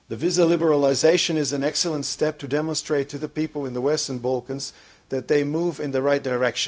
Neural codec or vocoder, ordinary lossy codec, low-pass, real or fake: codec, 16 kHz, 0.4 kbps, LongCat-Audio-Codec; none; none; fake